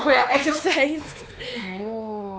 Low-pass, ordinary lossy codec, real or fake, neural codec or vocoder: none; none; fake; codec, 16 kHz, 2 kbps, X-Codec, WavLM features, trained on Multilingual LibriSpeech